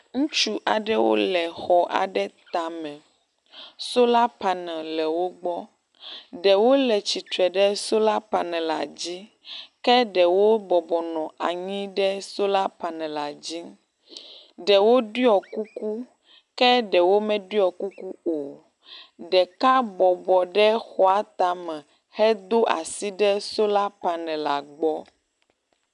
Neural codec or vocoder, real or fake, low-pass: none; real; 10.8 kHz